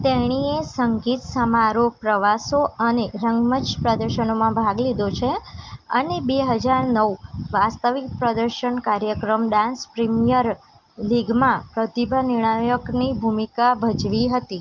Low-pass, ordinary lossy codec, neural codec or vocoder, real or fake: 7.2 kHz; Opus, 32 kbps; none; real